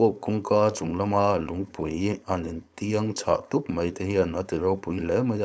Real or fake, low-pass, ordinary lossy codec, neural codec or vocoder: fake; none; none; codec, 16 kHz, 4.8 kbps, FACodec